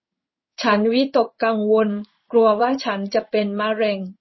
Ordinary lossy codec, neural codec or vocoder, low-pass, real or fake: MP3, 24 kbps; codec, 16 kHz in and 24 kHz out, 1 kbps, XY-Tokenizer; 7.2 kHz; fake